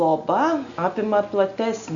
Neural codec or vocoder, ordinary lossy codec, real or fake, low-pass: none; Opus, 64 kbps; real; 7.2 kHz